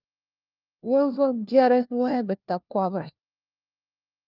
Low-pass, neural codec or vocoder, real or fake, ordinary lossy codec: 5.4 kHz; codec, 16 kHz, 1 kbps, FunCodec, trained on LibriTTS, 50 frames a second; fake; Opus, 24 kbps